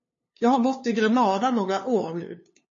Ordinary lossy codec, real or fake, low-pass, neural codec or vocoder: MP3, 32 kbps; fake; 7.2 kHz; codec, 16 kHz, 2 kbps, FunCodec, trained on LibriTTS, 25 frames a second